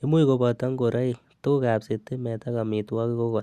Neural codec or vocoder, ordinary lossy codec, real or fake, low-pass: none; Opus, 64 kbps; real; 14.4 kHz